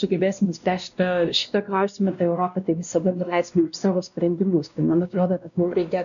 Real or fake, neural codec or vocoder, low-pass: fake; codec, 16 kHz, 1 kbps, X-Codec, WavLM features, trained on Multilingual LibriSpeech; 7.2 kHz